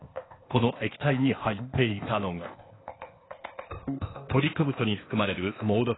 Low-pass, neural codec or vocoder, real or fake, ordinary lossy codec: 7.2 kHz; codec, 16 kHz, 0.8 kbps, ZipCodec; fake; AAC, 16 kbps